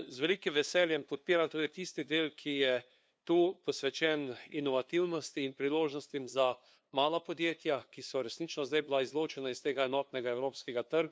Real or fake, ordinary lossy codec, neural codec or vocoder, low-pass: fake; none; codec, 16 kHz, 2 kbps, FunCodec, trained on LibriTTS, 25 frames a second; none